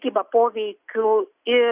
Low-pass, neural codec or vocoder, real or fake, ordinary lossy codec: 3.6 kHz; none; real; Opus, 64 kbps